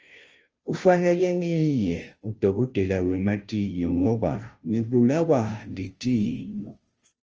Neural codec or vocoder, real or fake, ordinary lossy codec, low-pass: codec, 16 kHz, 0.5 kbps, FunCodec, trained on Chinese and English, 25 frames a second; fake; Opus, 24 kbps; 7.2 kHz